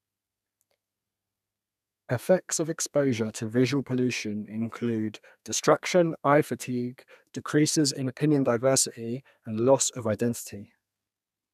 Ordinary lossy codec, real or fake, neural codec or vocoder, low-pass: none; fake; codec, 32 kHz, 1.9 kbps, SNAC; 14.4 kHz